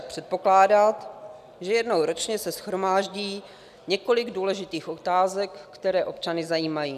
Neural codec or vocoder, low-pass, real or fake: none; 14.4 kHz; real